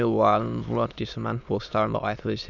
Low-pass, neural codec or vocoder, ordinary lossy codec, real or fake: 7.2 kHz; autoencoder, 22.05 kHz, a latent of 192 numbers a frame, VITS, trained on many speakers; none; fake